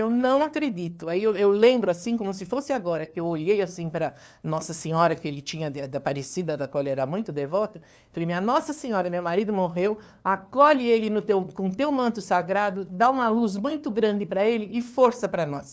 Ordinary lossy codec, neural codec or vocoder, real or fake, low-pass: none; codec, 16 kHz, 2 kbps, FunCodec, trained on LibriTTS, 25 frames a second; fake; none